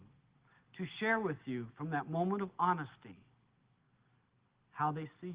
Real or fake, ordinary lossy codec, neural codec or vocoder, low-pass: real; Opus, 16 kbps; none; 3.6 kHz